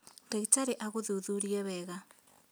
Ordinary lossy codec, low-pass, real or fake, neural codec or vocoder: none; none; real; none